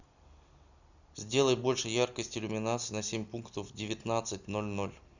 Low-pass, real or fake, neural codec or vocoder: 7.2 kHz; real; none